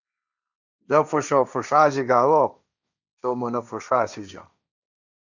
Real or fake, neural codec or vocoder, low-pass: fake; codec, 16 kHz, 1.1 kbps, Voila-Tokenizer; 7.2 kHz